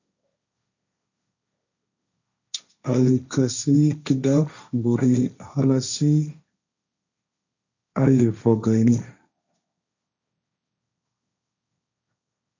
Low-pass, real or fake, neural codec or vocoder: 7.2 kHz; fake; codec, 16 kHz, 1.1 kbps, Voila-Tokenizer